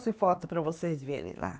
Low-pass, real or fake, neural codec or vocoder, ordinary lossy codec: none; fake; codec, 16 kHz, 2 kbps, X-Codec, HuBERT features, trained on LibriSpeech; none